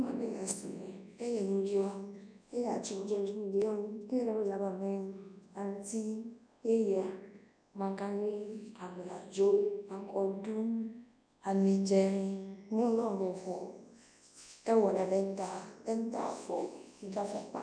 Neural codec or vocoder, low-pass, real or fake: codec, 24 kHz, 0.9 kbps, WavTokenizer, large speech release; 9.9 kHz; fake